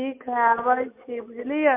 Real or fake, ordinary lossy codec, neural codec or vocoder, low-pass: real; MP3, 24 kbps; none; 3.6 kHz